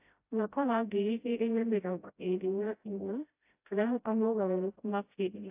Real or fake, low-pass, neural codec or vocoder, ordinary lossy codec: fake; 3.6 kHz; codec, 16 kHz, 0.5 kbps, FreqCodec, smaller model; AAC, 32 kbps